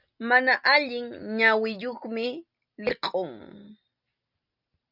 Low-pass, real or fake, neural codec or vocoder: 5.4 kHz; real; none